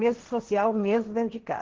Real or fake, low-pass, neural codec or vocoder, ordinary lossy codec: fake; 7.2 kHz; codec, 16 kHz, 1.1 kbps, Voila-Tokenizer; Opus, 16 kbps